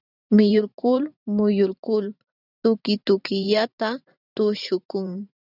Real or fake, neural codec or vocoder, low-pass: fake; vocoder, 44.1 kHz, 128 mel bands every 512 samples, BigVGAN v2; 5.4 kHz